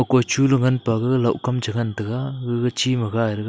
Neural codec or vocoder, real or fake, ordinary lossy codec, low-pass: none; real; none; none